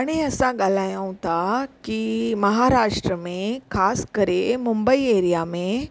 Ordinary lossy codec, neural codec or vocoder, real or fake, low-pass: none; none; real; none